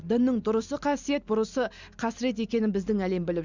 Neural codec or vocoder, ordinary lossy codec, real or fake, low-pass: none; Opus, 64 kbps; real; 7.2 kHz